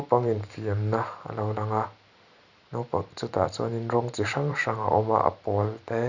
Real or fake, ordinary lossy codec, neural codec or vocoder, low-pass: fake; Opus, 64 kbps; vocoder, 44.1 kHz, 128 mel bands every 512 samples, BigVGAN v2; 7.2 kHz